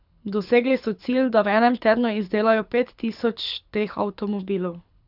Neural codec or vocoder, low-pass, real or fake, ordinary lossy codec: codec, 24 kHz, 6 kbps, HILCodec; 5.4 kHz; fake; none